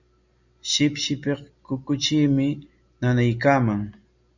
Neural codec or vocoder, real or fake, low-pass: none; real; 7.2 kHz